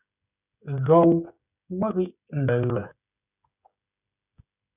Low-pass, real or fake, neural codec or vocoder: 3.6 kHz; fake; codec, 16 kHz, 16 kbps, FreqCodec, smaller model